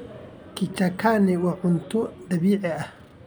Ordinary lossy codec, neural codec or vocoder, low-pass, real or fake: none; none; none; real